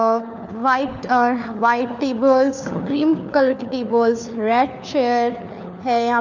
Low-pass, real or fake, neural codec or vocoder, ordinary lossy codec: 7.2 kHz; fake; codec, 16 kHz, 4 kbps, FunCodec, trained on LibriTTS, 50 frames a second; none